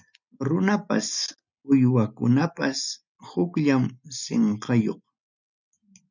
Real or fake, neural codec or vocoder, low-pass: real; none; 7.2 kHz